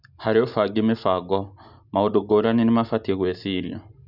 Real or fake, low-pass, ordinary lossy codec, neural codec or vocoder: real; 5.4 kHz; none; none